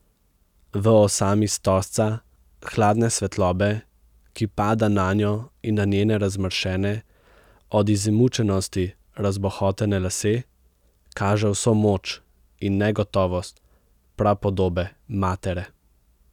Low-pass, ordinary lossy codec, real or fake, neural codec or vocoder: 19.8 kHz; none; real; none